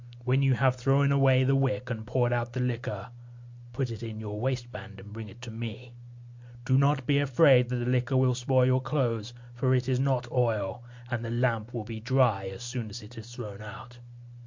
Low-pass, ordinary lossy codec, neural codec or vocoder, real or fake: 7.2 kHz; MP3, 48 kbps; none; real